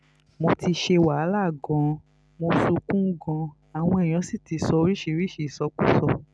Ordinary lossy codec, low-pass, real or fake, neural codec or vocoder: none; none; real; none